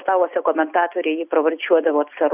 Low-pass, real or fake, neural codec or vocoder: 3.6 kHz; real; none